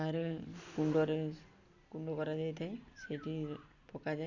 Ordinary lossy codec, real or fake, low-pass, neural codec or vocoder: none; real; 7.2 kHz; none